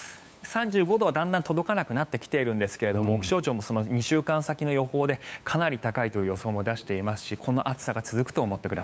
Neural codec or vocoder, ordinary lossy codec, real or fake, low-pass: codec, 16 kHz, 8 kbps, FunCodec, trained on LibriTTS, 25 frames a second; none; fake; none